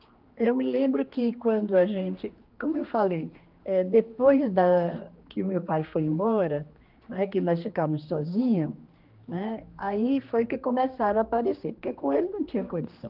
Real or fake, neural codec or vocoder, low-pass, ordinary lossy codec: fake; codec, 16 kHz, 2 kbps, X-Codec, HuBERT features, trained on general audio; 5.4 kHz; Opus, 32 kbps